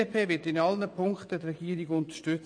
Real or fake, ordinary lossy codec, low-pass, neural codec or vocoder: fake; MP3, 48 kbps; 9.9 kHz; vocoder, 22.05 kHz, 80 mel bands, WaveNeXt